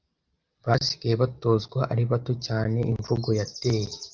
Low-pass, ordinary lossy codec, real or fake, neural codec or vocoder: 7.2 kHz; Opus, 24 kbps; real; none